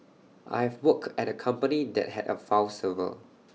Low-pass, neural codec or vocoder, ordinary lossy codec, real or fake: none; none; none; real